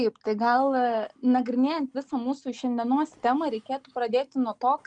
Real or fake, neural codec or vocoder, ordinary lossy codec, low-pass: real; none; MP3, 96 kbps; 9.9 kHz